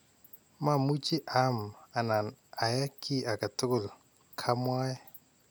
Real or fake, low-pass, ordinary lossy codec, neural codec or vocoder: real; none; none; none